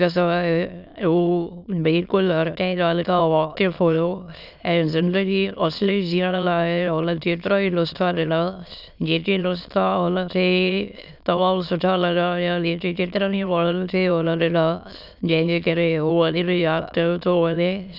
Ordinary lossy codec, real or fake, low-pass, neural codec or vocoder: none; fake; 5.4 kHz; autoencoder, 22.05 kHz, a latent of 192 numbers a frame, VITS, trained on many speakers